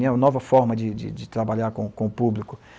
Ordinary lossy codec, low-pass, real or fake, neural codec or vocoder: none; none; real; none